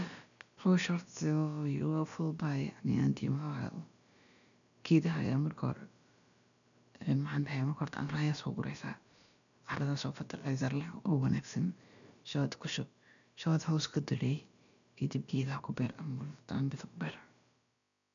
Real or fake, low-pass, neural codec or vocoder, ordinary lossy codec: fake; 7.2 kHz; codec, 16 kHz, about 1 kbps, DyCAST, with the encoder's durations; none